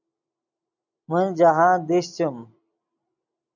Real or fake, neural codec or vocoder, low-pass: real; none; 7.2 kHz